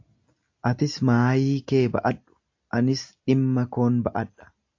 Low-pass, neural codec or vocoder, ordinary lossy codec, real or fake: 7.2 kHz; none; AAC, 48 kbps; real